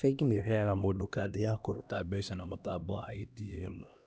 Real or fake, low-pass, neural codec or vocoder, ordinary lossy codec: fake; none; codec, 16 kHz, 1 kbps, X-Codec, HuBERT features, trained on LibriSpeech; none